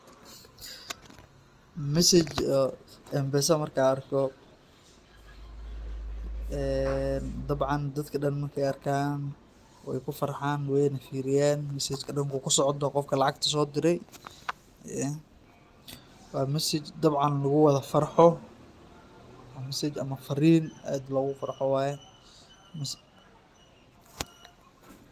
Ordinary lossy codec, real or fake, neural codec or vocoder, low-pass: Opus, 24 kbps; real; none; 14.4 kHz